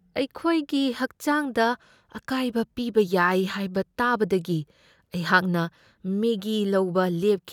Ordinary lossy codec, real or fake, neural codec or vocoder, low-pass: none; real; none; 19.8 kHz